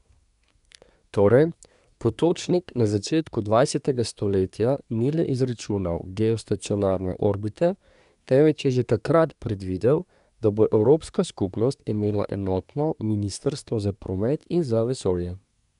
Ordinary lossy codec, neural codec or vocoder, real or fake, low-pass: none; codec, 24 kHz, 1 kbps, SNAC; fake; 10.8 kHz